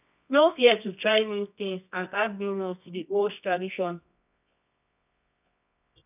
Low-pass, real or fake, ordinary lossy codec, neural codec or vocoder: 3.6 kHz; fake; none; codec, 24 kHz, 0.9 kbps, WavTokenizer, medium music audio release